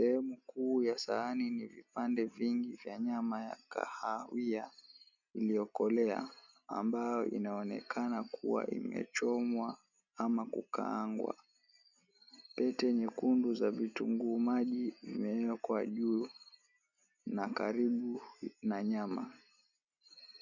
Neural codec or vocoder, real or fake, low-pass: none; real; 7.2 kHz